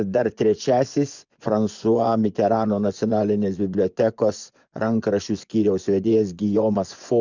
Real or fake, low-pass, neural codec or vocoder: fake; 7.2 kHz; vocoder, 24 kHz, 100 mel bands, Vocos